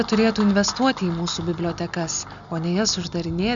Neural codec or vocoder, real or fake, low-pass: none; real; 7.2 kHz